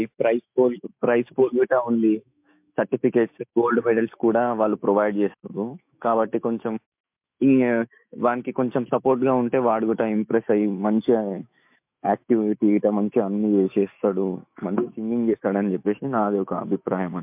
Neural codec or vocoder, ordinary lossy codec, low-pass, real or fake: autoencoder, 48 kHz, 128 numbers a frame, DAC-VAE, trained on Japanese speech; MP3, 32 kbps; 3.6 kHz; fake